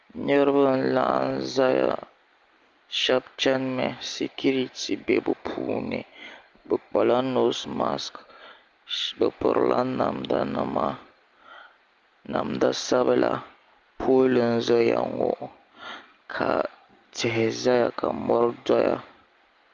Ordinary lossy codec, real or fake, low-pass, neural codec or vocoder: Opus, 32 kbps; real; 7.2 kHz; none